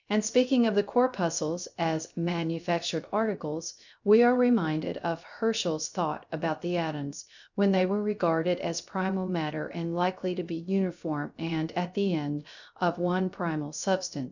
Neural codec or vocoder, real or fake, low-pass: codec, 16 kHz, 0.3 kbps, FocalCodec; fake; 7.2 kHz